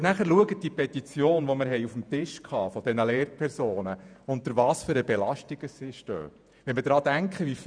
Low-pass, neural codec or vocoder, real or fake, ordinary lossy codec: 9.9 kHz; vocoder, 48 kHz, 128 mel bands, Vocos; fake; none